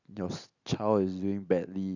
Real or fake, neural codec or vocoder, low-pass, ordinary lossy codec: real; none; 7.2 kHz; none